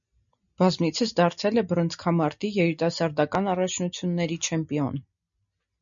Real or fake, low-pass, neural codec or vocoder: real; 7.2 kHz; none